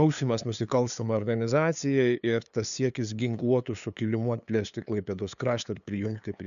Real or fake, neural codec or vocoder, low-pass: fake; codec, 16 kHz, 2 kbps, FunCodec, trained on LibriTTS, 25 frames a second; 7.2 kHz